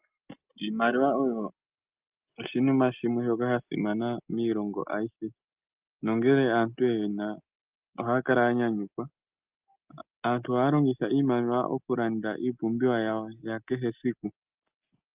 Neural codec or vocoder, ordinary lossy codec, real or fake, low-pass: none; Opus, 24 kbps; real; 3.6 kHz